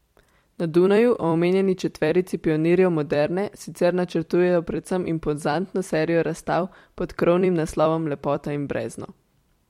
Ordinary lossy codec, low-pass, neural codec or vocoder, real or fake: MP3, 64 kbps; 19.8 kHz; vocoder, 44.1 kHz, 128 mel bands every 256 samples, BigVGAN v2; fake